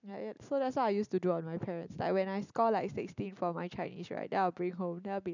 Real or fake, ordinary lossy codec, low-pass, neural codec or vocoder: real; none; 7.2 kHz; none